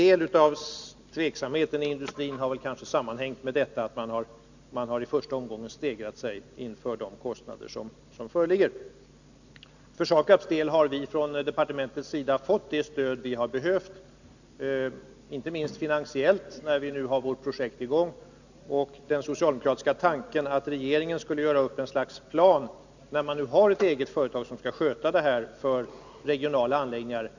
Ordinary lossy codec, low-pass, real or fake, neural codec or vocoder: none; 7.2 kHz; real; none